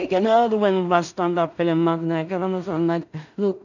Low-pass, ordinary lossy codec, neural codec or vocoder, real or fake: 7.2 kHz; none; codec, 16 kHz in and 24 kHz out, 0.4 kbps, LongCat-Audio-Codec, two codebook decoder; fake